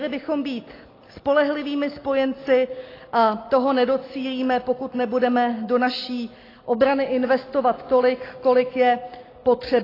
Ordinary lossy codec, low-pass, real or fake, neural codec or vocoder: AAC, 24 kbps; 5.4 kHz; real; none